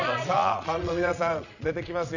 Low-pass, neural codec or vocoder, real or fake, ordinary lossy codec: 7.2 kHz; vocoder, 22.05 kHz, 80 mel bands, Vocos; fake; none